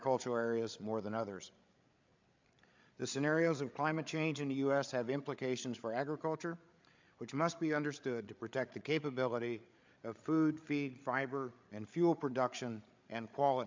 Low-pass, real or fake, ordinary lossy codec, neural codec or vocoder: 7.2 kHz; fake; MP3, 64 kbps; codec, 16 kHz, 8 kbps, FreqCodec, larger model